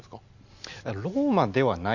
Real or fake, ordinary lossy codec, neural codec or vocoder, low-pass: real; none; none; 7.2 kHz